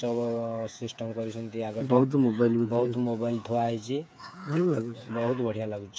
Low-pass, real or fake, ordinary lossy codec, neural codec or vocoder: none; fake; none; codec, 16 kHz, 8 kbps, FreqCodec, smaller model